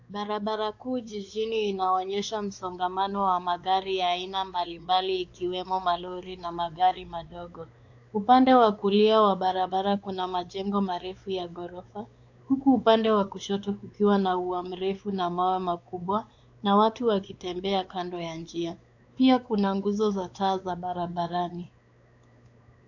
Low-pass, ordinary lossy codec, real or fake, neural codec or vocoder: 7.2 kHz; AAC, 48 kbps; fake; codec, 16 kHz, 4 kbps, X-Codec, WavLM features, trained on Multilingual LibriSpeech